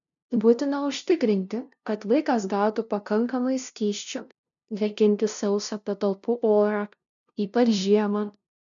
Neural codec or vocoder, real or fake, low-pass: codec, 16 kHz, 0.5 kbps, FunCodec, trained on LibriTTS, 25 frames a second; fake; 7.2 kHz